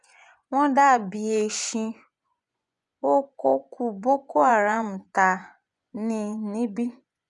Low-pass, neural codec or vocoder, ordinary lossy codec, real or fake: 10.8 kHz; vocoder, 24 kHz, 100 mel bands, Vocos; none; fake